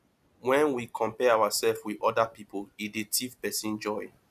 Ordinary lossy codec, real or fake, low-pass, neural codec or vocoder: none; real; 14.4 kHz; none